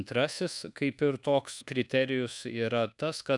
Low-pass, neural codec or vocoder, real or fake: 10.8 kHz; codec, 24 kHz, 1.2 kbps, DualCodec; fake